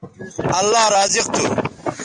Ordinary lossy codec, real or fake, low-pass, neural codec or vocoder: MP3, 64 kbps; real; 9.9 kHz; none